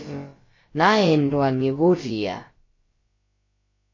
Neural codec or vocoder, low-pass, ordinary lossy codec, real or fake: codec, 16 kHz, about 1 kbps, DyCAST, with the encoder's durations; 7.2 kHz; MP3, 32 kbps; fake